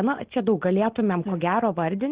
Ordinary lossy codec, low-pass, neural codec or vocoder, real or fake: Opus, 16 kbps; 3.6 kHz; none; real